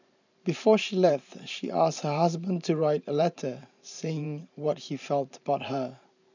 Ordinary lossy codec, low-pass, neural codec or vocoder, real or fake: none; 7.2 kHz; vocoder, 44.1 kHz, 128 mel bands every 512 samples, BigVGAN v2; fake